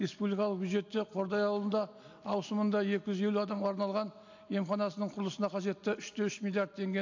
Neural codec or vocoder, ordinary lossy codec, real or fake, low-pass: none; AAC, 48 kbps; real; 7.2 kHz